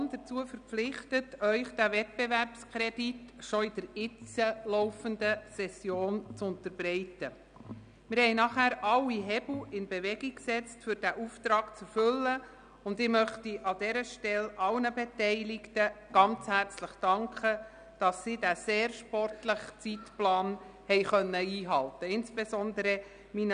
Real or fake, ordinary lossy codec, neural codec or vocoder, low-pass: real; none; none; 9.9 kHz